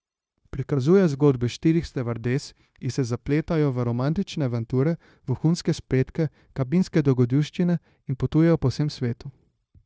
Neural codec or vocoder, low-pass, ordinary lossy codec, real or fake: codec, 16 kHz, 0.9 kbps, LongCat-Audio-Codec; none; none; fake